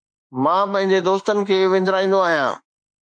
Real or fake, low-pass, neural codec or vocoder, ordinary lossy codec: fake; 9.9 kHz; autoencoder, 48 kHz, 32 numbers a frame, DAC-VAE, trained on Japanese speech; MP3, 64 kbps